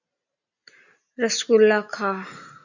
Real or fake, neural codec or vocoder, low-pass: real; none; 7.2 kHz